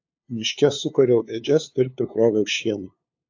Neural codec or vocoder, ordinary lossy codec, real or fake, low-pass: codec, 16 kHz, 2 kbps, FunCodec, trained on LibriTTS, 25 frames a second; AAC, 48 kbps; fake; 7.2 kHz